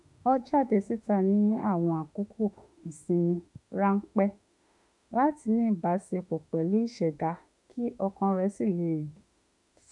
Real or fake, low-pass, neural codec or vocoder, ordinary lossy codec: fake; 10.8 kHz; autoencoder, 48 kHz, 32 numbers a frame, DAC-VAE, trained on Japanese speech; none